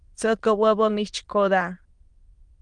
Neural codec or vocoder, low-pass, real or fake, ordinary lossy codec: autoencoder, 22.05 kHz, a latent of 192 numbers a frame, VITS, trained on many speakers; 9.9 kHz; fake; Opus, 24 kbps